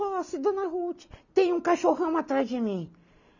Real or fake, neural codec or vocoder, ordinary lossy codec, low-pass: real; none; none; 7.2 kHz